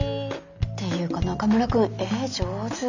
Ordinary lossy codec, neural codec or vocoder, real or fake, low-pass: none; none; real; 7.2 kHz